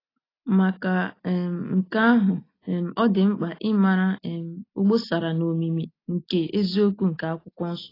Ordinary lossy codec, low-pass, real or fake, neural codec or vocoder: AAC, 24 kbps; 5.4 kHz; real; none